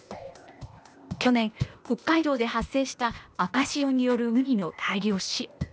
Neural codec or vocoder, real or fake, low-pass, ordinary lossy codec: codec, 16 kHz, 0.8 kbps, ZipCodec; fake; none; none